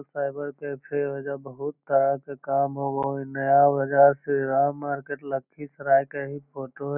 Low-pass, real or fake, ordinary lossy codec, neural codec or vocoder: 3.6 kHz; real; none; none